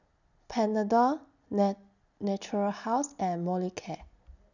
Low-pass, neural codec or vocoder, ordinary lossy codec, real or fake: 7.2 kHz; none; none; real